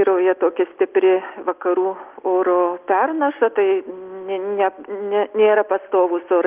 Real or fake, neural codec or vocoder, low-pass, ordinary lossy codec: real; none; 3.6 kHz; Opus, 32 kbps